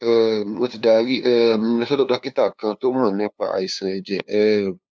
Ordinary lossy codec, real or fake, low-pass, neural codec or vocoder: none; fake; none; codec, 16 kHz, 2 kbps, FunCodec, trained on LibriTTS, 25 frames a second